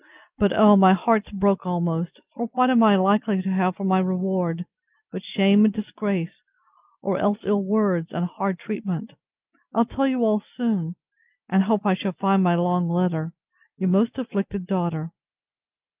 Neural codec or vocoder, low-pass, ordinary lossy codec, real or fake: none; 3.6 kHz; Opus, 24 kbps; real